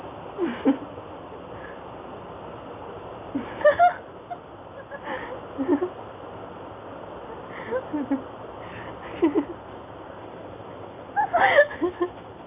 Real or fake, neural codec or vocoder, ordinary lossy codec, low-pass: real; none; AAC, 24 kbps; 3.6 kHz